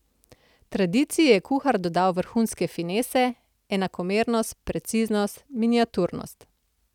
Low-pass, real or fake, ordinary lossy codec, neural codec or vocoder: 19.8 kHz; real; none; none